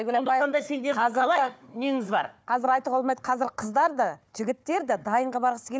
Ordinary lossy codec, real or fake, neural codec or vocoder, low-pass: none; fake; codec, 16 kHz, 4 kbps, FunCodec, trained on Chinese and English, 50 frames a second; none